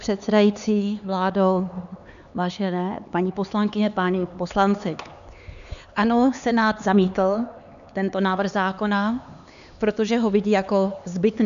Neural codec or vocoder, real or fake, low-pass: codec, 16 kHz, 4 kbps, X-Codec, HuBERT features, trained on LibriSpeech; fake; 7.2 kHz